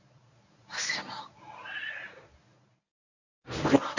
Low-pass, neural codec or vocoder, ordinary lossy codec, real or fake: 7.2 kHz; codec, 24 kHz, 0.9 kbps, WavTokenizer, medium speech release version 1; none; fake